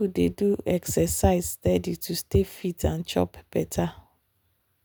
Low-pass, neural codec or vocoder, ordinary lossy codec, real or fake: none; none; none; real